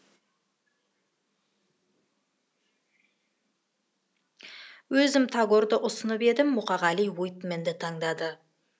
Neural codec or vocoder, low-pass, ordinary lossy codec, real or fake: none; none; none; real